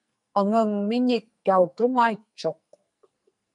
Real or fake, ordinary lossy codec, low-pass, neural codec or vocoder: fake; MP3, 96 kbps; 10.8 kHz; codec, 44.1 kHz, 2.6 kbps, SNAC